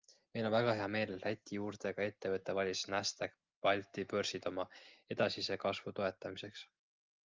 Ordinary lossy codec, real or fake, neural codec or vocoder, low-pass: Opus, 32 kbps; real; none; 7.2 kHz